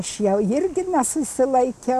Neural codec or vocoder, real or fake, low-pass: none; real; 14.4 kHz